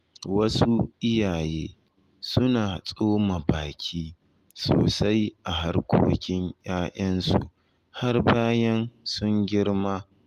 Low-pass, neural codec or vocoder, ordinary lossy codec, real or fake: 14.4 kHz; none; Opus, 32 kbps; real